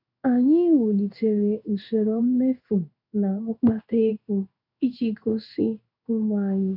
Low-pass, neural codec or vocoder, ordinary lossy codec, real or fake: 5.4 kHz; codec, 16 kHz in and 24 kHz out, 1 kbps, XY-Tokenizer; none; fake